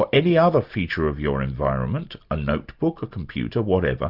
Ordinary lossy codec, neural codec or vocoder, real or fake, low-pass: Opus, 64 kbps; none; real; 5.4 kHz